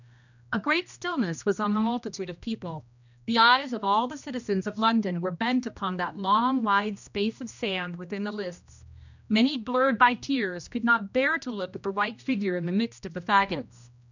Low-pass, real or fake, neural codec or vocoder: 7.2 kHz; fake; codec, 16 kHz, 1 kbps, X-Codec, HuBERT features, trained on general audio